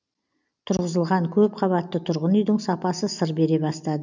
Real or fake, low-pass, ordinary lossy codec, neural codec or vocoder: real; 7.2 kHz; none; none